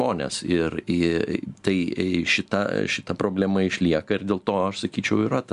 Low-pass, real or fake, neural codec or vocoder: 10.8 kHz; real; none